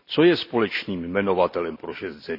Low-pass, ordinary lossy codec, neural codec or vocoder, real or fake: 5.4 kHz; none; none; real